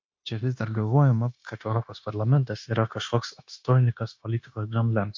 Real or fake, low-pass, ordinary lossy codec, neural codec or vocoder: fake; 7.2 kHz; MP3, 48 kbps; codec, 16 kHz, 0.9 kbps, LongCat-Audio-Codec